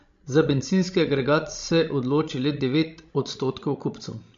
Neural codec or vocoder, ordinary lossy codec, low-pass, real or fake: codec, 16 kHz, 16 kbps, FreqCodec, larger model; MP3, 48 kbps; 7.2 kHz; fake